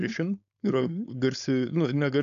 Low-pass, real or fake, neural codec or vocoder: 7.2 kHz; fake; codec, 16 kHz, 4.8 kbps, FACodec